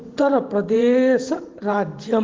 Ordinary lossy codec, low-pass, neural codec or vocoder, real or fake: Opus, 16 kbps; 7.2 kHz; vocoder, 44.1 kHz, 128 mel bands every 512 samples, BigVGAN v2; fake